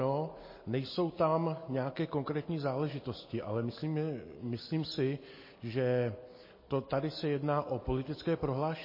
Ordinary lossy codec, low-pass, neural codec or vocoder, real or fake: MP3, 24 kbps; 5.4 kHz; none; real